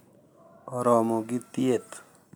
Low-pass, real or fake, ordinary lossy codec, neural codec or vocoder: none; real; none; none